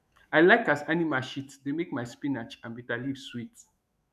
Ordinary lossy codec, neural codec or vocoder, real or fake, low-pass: none; autoencoder, 48 kHz, 128 numbers a frame, DAC-VAE, trained on Japanese speech; fake; 14.4 kHz